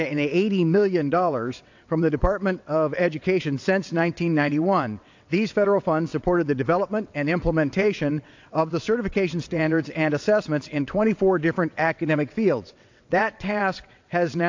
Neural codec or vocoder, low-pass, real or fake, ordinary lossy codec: vocoder, 22.05 kHz, 80 mel bands, WaveNeXt; 7.2 kHz; fake; AAC, 48 kbps